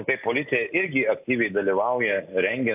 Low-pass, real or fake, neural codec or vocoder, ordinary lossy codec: 3.6 kHz; real; none; AAC, 32 kbps